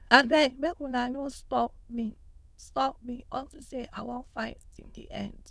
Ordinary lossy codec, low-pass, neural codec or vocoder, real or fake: none; none; autoencoder, 22.05 kHz, a latent of 192 numbers a frame, VITS, trained on many speakers; fake